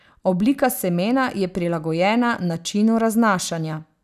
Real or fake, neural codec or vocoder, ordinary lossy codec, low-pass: real; none; none; 14.4 kHz